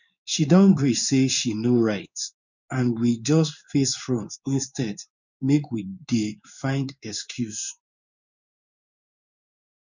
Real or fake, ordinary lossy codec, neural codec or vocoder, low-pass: fake; none; codec, 16 kHz in and 24 kHz out, 1 kbps, XY-Tokenizer; 7.2 kHz